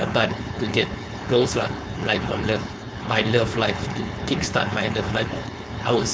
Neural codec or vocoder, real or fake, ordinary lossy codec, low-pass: codec, 16 kHz, 4.8 kbps, FACodec; fake; none; none